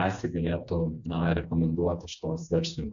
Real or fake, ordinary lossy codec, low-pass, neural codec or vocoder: fake; AAC, 64 kbps; 7.2 kHz; codec, 16 kHz, 2 kbps, FreqCodec, smaller model